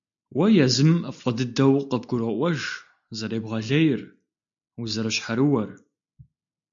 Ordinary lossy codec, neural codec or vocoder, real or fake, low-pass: AAC, 64 kbps; none; real; 7.2 kHz